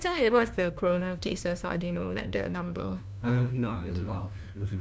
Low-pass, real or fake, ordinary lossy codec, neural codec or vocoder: none; fake; none; codec, 16 kHz, 1 kbps, FunCodec, trained on LibriTTS, 50 frames a second